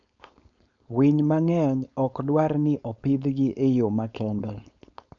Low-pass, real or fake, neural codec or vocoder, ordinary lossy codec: 7.2 kHz; fake; codec, 16 kHz, 4.8 kbps, FACodec; none